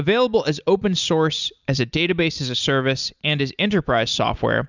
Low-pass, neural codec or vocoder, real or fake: 7.2 kHz; none; real